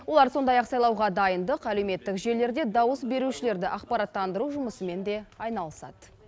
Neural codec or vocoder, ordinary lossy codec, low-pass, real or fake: none; none; none; real